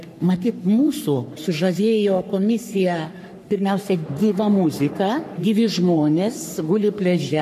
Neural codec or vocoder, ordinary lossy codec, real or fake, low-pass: codec, 44.1 kHz, 3.4 kbps, Pupu-Codec; AAC, 96 kbps; fake; 14.4 kHz